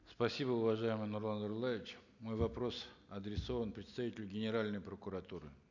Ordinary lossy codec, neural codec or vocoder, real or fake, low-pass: none; none; real; 7.2 kHz